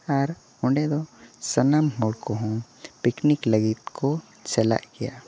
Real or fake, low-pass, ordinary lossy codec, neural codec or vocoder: real; none; none; none